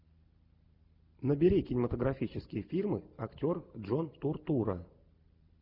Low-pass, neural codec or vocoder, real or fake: 5.4 kHz; none; real